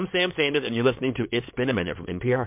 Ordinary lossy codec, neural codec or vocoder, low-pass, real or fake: MP3, 32 kbps; codec, 16 kHz in and 24 kHz out, 2.2 kbps, FireRedTTS-2 codec; 3.6 kHz; fake